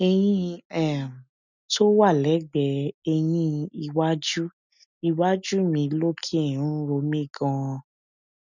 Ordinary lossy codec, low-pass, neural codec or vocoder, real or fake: none; 7.2 kHz; none; real